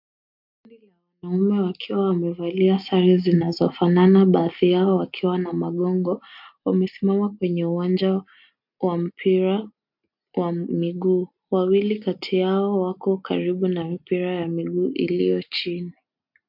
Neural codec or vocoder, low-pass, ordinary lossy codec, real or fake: none; 5.4 kHz; MP3, 48 kbps; real